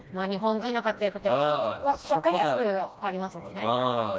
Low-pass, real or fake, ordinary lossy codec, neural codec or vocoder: none; fake; none; codec, 16 kHz, 1 kbps, FreqCodec, smaller model